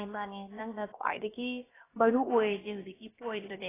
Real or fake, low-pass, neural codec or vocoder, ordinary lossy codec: fake; 3.6 kHz; codec, 16 kHz, about 1 kbps, DyCAST, with the encoder's durations; AAC, 16 kbps